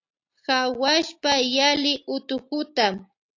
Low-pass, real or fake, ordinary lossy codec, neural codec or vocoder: 7.2 kHz; real; AAC, 48 kbps; none